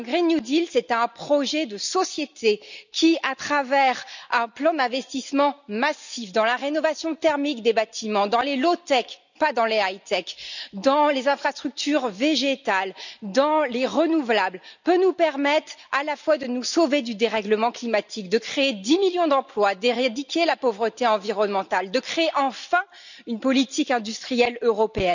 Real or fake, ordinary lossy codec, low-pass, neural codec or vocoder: real; none; 7.2 kHz; none